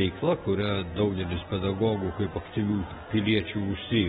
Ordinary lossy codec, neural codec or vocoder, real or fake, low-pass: AAC, 16 kbps; vocoder, 48 kHz, 128 mel bands, Vocos; fake; 19.8 kHz